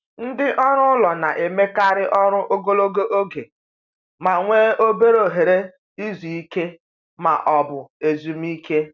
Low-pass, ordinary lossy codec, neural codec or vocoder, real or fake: 7.2 kHz; none; none; real